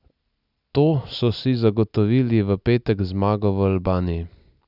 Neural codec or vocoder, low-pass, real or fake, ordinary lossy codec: none; 5.4 kHz; real; none